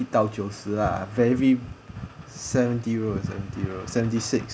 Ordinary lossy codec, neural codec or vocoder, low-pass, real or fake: none; none; none; real